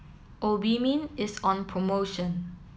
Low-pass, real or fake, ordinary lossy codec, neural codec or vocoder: none; real; none; none